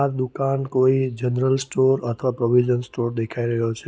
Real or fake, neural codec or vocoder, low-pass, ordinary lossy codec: real; none; none; none